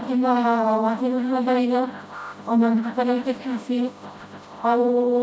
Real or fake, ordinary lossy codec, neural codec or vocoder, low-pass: fake; none; codec, 16 kHz, 0.5 kbps, FreqCodec, smaller model; none